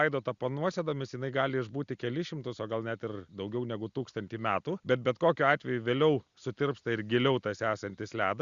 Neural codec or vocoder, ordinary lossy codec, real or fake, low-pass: none; Opus, 64 kbps; real; 7.2 kHz